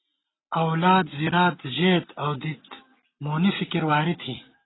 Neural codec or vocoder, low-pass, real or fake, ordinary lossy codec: none; 7.2 kHz; real; AAC, 16 kbps